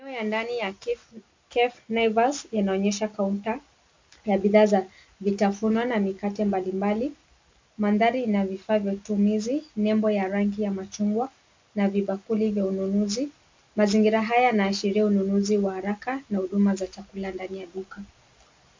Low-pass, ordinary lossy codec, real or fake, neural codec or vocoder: 7.2 kHz; MP3, 64 kbps; real; none